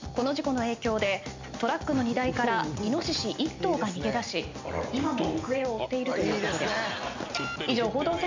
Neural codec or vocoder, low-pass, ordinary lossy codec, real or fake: none; 7.2 kHz; MP3, 64 kbps; real